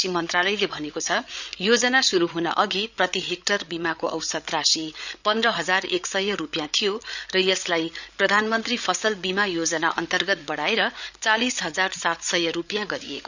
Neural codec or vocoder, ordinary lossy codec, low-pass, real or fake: codec, 16 kHz, 8 kbps, FreqCodec, larger model; none; 7.2 kHz; fake